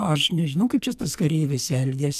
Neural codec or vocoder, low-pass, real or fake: codec, 44.1 kHz, 2.6 kbps, SNAC; 14.4 kHz; fake